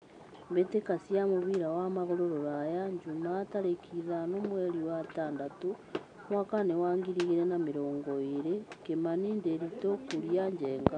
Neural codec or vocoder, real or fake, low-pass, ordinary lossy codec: none; real; 9.9 kHz; none